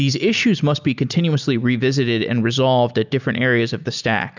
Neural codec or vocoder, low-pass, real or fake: none; 7.2 kHz; real